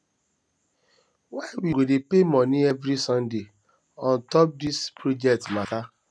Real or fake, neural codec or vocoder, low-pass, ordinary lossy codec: real; none; none; none